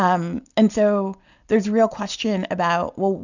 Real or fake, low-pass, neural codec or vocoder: real; 7.2 kHz; none